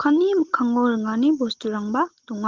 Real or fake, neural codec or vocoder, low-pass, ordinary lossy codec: real; none; 7.2 kHz; Opus, 16 kbps